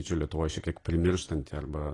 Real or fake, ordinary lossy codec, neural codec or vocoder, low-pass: real; AAC, 32 kbps; none; 10.8 kHz